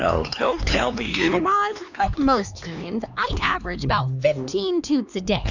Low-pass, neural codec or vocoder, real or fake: 7.2 kHz; codec, 16 kHz, 2 kbps, X-Codec, HuBERT features, trained on LibriSpeech; fake